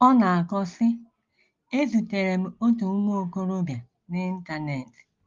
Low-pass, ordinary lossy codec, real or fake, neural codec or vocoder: 7.2 kHz; Opus, 32 kbps; real; none